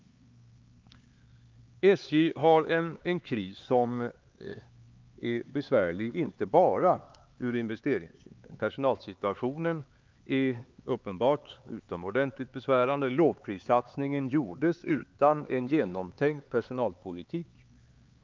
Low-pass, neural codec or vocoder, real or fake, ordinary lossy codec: 7.2 kHz; codec, 16 kHz, 4 kbps, X-Codec, HuBERT features, trained on LibriSpeech; fake; Opus, 24 kbps